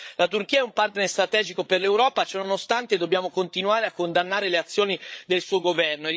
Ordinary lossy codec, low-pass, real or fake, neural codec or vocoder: none; none; fake; codec, 16 kHz, 8 kbps, FreqCodec, larger model